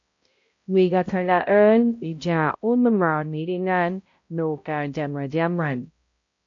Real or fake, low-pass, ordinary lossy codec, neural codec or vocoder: fake; 7.2 kHz; AAC, 48 kbps; codec, 16 kHz, 0.5 kbps, X-Codec, HuBERT features, trained on balanced general audio